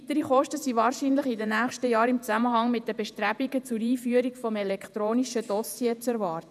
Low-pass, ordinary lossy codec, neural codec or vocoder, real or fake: 14.4 kHz; none; vocoder, 48 kHz, 128 mel bands, Vocos; fake